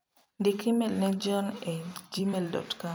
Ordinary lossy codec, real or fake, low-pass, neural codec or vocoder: none; fake; none; vocoder, 44.1 kHz, 128 mel bands every 256 samples, BigVGAN v2